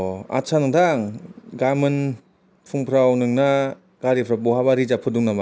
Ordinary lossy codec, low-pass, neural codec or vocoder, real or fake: none; none; none; real